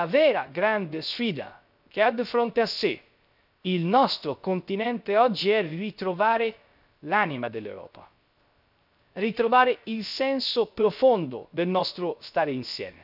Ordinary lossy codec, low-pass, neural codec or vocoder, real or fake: none; 5.4 kHz; codec, 16 kHz, 0.3 kbps, FocalCodec; fake